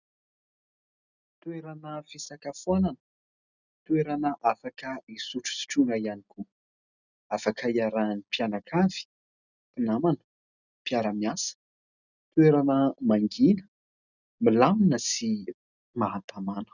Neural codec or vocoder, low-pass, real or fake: none; 7.2 kHz; real